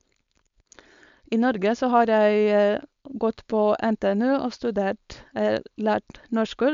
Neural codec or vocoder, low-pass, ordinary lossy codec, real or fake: codec, 16 kHz, 4.8 kbps, FACodec; 7.2 kHz; MP3, 64 kbps; fake